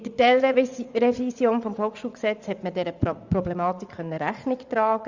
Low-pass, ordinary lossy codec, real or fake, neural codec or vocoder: 7.2 kHz; Opus, 64 kbps; fake; vocoder, 44.1 kHz, 80 mel bands, Vocos